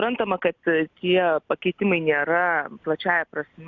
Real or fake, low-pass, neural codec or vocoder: real; 7.2 kHz; none